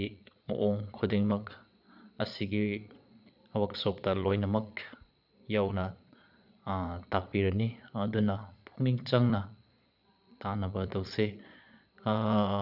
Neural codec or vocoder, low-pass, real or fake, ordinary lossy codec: vocoder, 22.05 kHz, 80 mel bands, Vocos; 5.4 kHz; fake; none